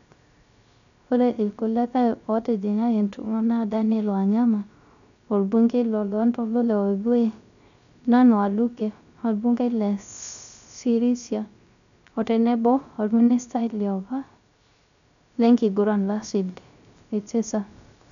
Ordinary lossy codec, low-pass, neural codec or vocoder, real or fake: none; 7.2 kHz; codec, 16 kHz, 0.3 kbps, FocalCodec; fake